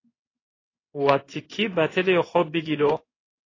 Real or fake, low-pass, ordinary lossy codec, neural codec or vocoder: fake; 7.2 kHz; AAC, 32 kbps; codec, 16 kHz in and 24 kHz out, 1 kbps, XY-Tokenizer